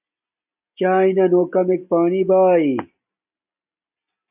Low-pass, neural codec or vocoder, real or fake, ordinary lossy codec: 3.6 kHz; none; real; AAC, 32 kbps